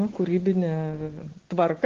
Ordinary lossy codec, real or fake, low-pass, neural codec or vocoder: Opus, 16 kbps; real; 7.2 kHz; none